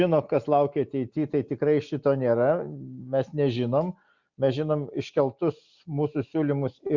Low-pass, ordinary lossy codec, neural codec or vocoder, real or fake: 7.2 kHz; Opus, 64 kbps; none; real